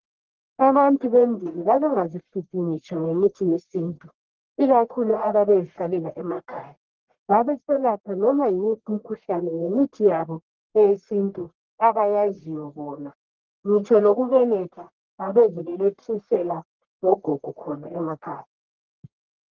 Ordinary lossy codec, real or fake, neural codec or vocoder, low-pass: Opus, 16 kbps; fake; codec, 44.1 kHz, 1.7 kbps, Pupu-Codec; 7.2 kHz